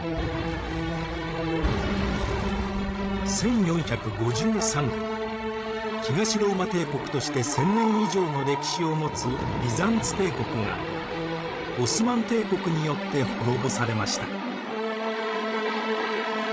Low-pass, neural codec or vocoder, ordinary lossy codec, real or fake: none; codec, 16 kHz, 16 kbps, FreqCodec, larger model; none; fake